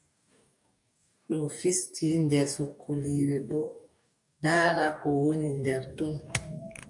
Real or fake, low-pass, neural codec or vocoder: fake; 10.8 kHz; codec, 44.1 kHz, 2.6 kbps, DAC